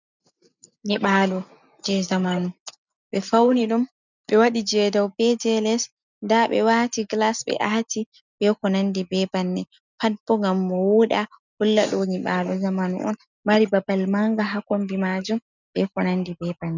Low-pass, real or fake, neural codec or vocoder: 7.2 kHz; real; none